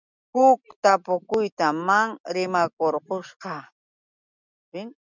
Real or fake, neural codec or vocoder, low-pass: real; none; 7.2 kHz